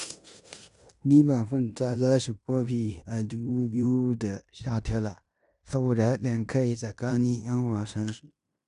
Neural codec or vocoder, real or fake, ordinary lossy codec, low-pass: codec, 16 kHz in and 24 kHz out, 0.9 kbps, LongCat-Audio-Codec, fine tuned four codebook decoder; fake; none; 10.8 kHz